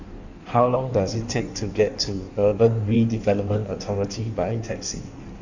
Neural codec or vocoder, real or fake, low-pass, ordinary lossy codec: codec, 16 kHz in and 24 kHz out, 1.1 kbps, FireRedTTS-2 codec; fake; 7.2 kHz; none